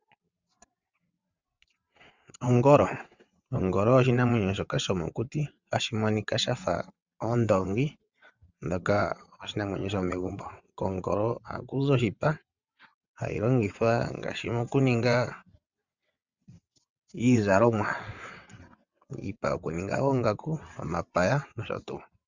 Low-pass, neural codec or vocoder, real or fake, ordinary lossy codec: 7.2 kHz; vocoder, 22.05 kHz, 80 mel bands, WaveNeXt; fake; Opus, 64 kbps